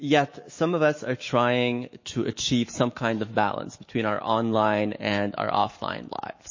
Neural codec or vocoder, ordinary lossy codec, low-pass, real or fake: autoencoder, 48 kHz, 128 numbers a frame, DAC-VAE, trained on Japanese speech; MP3, 32 kbps; 7.2 kHz; fake